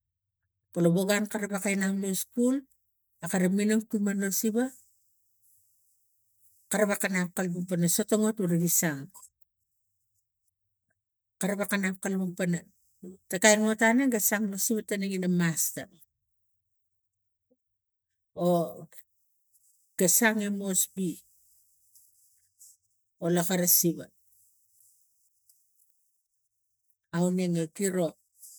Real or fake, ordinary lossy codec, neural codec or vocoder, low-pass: real; none; none; none